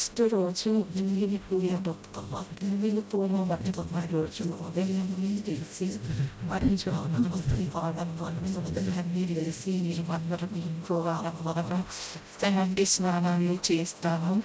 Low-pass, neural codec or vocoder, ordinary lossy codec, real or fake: none; codec, 16 kHz, 0.5 kbps, FreqCodec, smaller model; none; fake